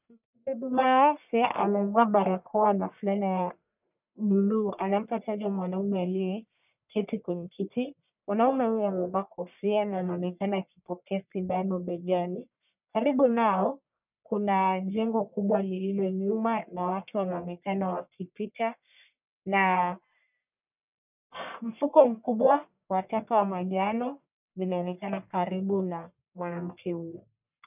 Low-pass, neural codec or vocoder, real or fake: 3.6 kHz; codec, 44.1 kHz, 1.7 kbps, Pupu-Codec; fake